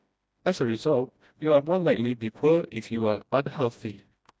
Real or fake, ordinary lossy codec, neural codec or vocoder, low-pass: fake; none; codec, 16 kHz, 1 kbps, FreqCodec, smaller model; none